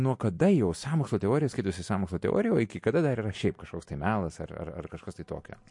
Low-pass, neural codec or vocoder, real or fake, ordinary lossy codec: 10.8 kHz; none; real; MP3, 48 kbps